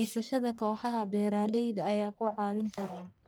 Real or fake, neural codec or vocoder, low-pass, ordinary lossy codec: fake; codec, 44.1 kHz, 1.7 kbps, Pupu-Codec; none; none